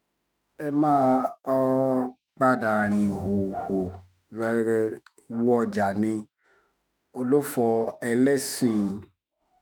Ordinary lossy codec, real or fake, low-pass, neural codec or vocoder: none; fake; none; autoencoder, 48 kHz, 32 numbers a frame, DAC-VAE, trained on Japanese speech